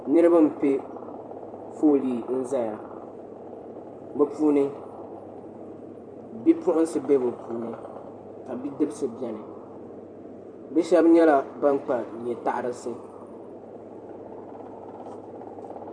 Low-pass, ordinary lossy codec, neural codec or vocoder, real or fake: 9.9 kHz; MP3, 64 kbps; vocoder, 44.1 kHz, 128 mel bands every 512 samples, BigVGAN v2; fake